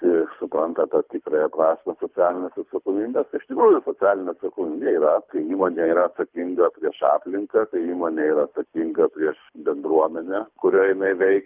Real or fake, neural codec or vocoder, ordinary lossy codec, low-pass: fake; codec, 24 kHz, 6 kbps, HILCodec; Opus, 24 kbps; 3.6 kHz